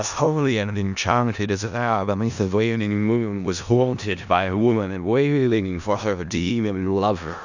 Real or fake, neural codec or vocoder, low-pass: fake; codec, 16 kHz in and 24 kHz out, 0.4 kbps, LongCat-Audio-Codec, four codebook decoder; 7.2 kHz